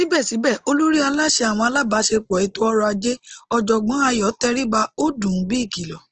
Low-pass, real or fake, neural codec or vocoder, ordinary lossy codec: 7.2 kHz; real; none; Opus, 16 kbps